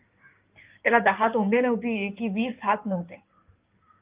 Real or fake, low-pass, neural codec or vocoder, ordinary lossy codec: fake; 3.6 kHz; codec, 16 kHz in and 24 kHz out, 2.2 kbps, FireRedTTS-2 codec; Opus, 24 kbps